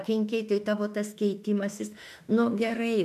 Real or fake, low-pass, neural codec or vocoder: fake; 14.4 kHz; autoencoder, 48 kHz, 32 numbers a frame, DAC-VAE, trained on Japanese speech